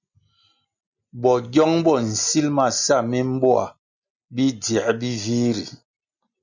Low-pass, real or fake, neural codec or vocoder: 7.2 kHz; real; none